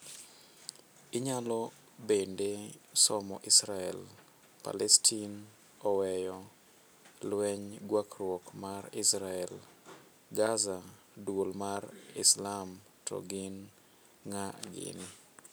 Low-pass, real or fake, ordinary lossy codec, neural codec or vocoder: none; real; none; none